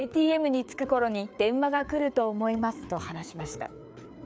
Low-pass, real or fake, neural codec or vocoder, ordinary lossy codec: none; fake; codec, 16 kHz, 4 kbps, FreqCodec, larger model; none